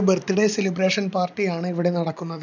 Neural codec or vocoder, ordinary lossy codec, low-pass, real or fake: none; none; 7.2 kHz; real